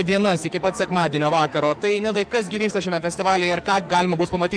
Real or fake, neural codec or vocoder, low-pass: fake; codec, 44.1 kHz, 2.6 kbps, SNAC; 9.9 kHz